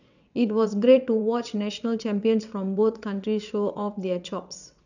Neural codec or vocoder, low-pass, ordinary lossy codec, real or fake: vocoder, 22.05 kHz, 80 mel bands, WaveNeXt; 7.2 kHz; none; fake